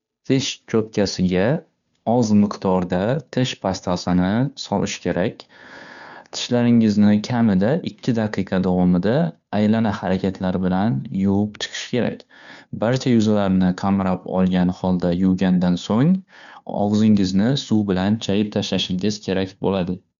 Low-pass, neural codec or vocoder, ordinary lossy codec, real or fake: 7.2 kHz; codec, 16 kHz, 2 kbps, FunCodec, trained on Chinese and English, 25 frames a second; none; fake